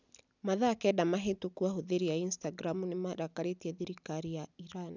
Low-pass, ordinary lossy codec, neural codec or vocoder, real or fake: 7.2 kHz; none; none; real